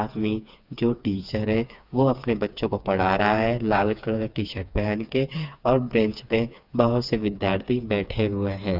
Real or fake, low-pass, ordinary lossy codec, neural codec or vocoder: fake; 5.4 kHz; none; codec, 16 kHz, 4 kbps, FreqCodec, smaller model